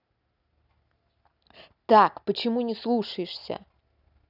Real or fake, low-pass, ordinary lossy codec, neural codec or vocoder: real; 5.4 kHz; none; none